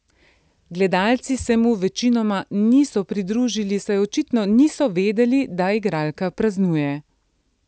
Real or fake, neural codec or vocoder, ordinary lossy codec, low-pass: real; none; none; none